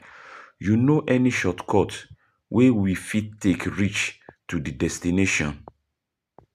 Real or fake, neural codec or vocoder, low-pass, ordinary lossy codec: real; none; 14.4 kHz; none